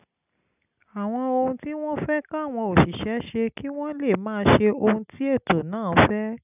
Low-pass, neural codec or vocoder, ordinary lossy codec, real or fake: 3.6 kHz; none; none; real